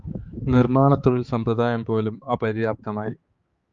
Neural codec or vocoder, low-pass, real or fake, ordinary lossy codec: codec, 16 kHz, 4 kbps, X-Codec, HuBERT features, trained on balanced general audio; 7.2 kHz; fake; Opus, 32 kbps